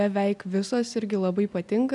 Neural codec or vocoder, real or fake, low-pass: none; real; 10.8 kHz